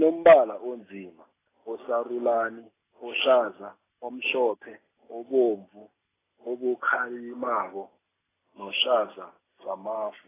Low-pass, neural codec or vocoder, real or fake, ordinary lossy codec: 3.6 kHz; none; real; AAC, 16 kbps